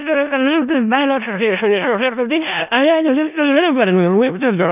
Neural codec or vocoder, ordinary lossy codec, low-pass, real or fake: codec, 16 kHz in and 24 kHz out, 0.4 kbps, LongCat-Audio-Codec, four codebook decoder; none; 3.6 kHz; fake